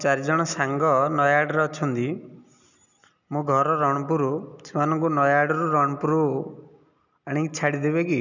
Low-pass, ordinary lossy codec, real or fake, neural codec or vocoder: 7.2 kHz; none; real; none